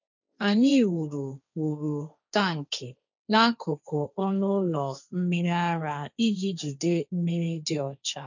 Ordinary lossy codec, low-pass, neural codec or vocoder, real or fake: none; 7.2 kHz; codec, 16 kHz, 1.1 kbps, Voila-Tokenizer; fake